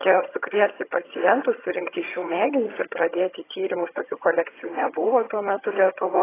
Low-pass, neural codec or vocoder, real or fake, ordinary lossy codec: 3.6 kHz; vocoder, 22.05 kHz, 80 mel bands, HiFi-GAN; fake; AAC, 16 kbps